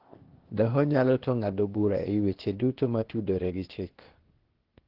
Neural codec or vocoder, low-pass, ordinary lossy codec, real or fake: codec, 16 kHz, 0.8 kbps, ZipCodec; 5.4 kHz; Opus, 16 kbps; fake